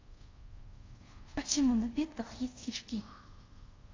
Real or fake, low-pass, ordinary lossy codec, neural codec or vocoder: fake; 7.2 kHz; AAC, 32 kbps; codec, 24 kHz, 0.5 kbps, DualCodec